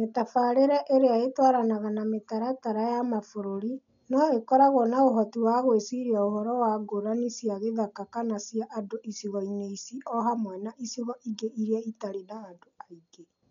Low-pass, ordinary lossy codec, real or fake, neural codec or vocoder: 7.2 kHz; none; real; none